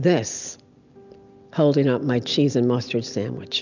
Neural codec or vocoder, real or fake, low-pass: none; real; 7.2 kHz